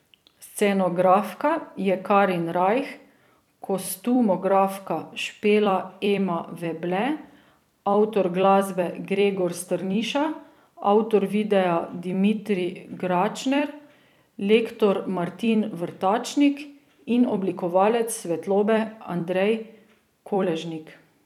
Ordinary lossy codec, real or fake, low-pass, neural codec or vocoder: none; fake; 19.8 kHz; vocoder, 44.1 kHz, 128 mel bands every 256 samples, BigVGAN v2